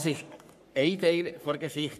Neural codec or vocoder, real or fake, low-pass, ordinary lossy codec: codec, 44.1 kHz, 3.4 kbps, Pupu-Codec; fake; 14.4 kHz; none